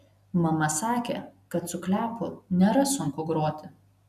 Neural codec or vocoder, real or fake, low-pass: none; real; 14.4 kHz